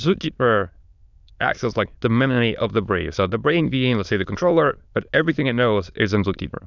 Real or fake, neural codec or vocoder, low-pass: fake; autoencoder, 22.05 kHz, a latent of 192 numbers a frame, VITS, trained on many speakers; 7.2 kHz